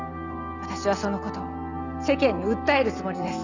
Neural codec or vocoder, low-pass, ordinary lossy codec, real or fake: none; 7.2 kHz; none; real